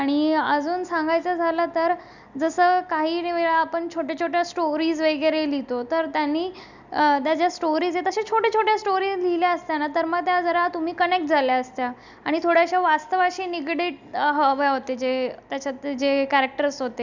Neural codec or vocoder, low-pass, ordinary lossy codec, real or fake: none; 7.2 kHz; none; real